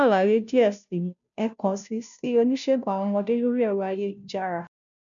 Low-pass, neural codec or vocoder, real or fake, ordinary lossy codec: 7.2 kHz; codec, 16 kHz, 0.5 kbps, FunCodec, trained on Chinese and English, 25 frames a second; fake; none